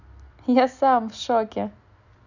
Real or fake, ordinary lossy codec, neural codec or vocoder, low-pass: real; none; none; 7.2 kHz